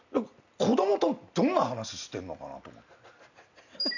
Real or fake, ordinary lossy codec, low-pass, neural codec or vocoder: real; none; 7.2 kHz; none